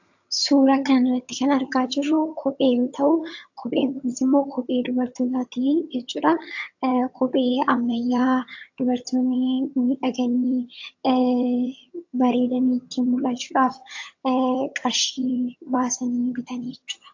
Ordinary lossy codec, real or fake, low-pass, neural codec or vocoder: AAC, 48 kbps; fake; 7.2 kHz; vocoder, 22.05 kHz, 80 mel bands, HiFi-GAN